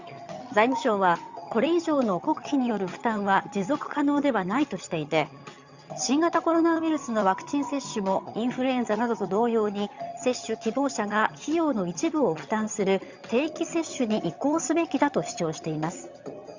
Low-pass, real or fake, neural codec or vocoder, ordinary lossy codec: 7.2 kHz; fake; vocoder, 22.05 kHz, 80 mel bands, HiFi-GAN; Opus, 64 kbps